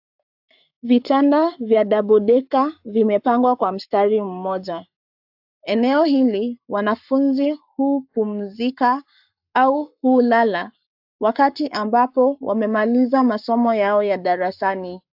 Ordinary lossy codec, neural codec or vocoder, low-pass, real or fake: AAC, 48 kbps; codec, 44.1 kHz, 7.8 kbps, Pupu-Codec; 5.4 kHz; fake